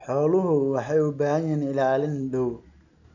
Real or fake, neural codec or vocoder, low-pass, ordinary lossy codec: real; none; 7.2 kHz; none